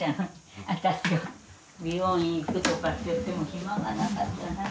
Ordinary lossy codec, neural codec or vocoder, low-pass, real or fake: none; none; none; real